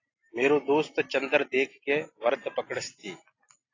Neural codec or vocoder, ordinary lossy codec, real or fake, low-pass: none; AAC, 32 kbps; real; 7.2 kHz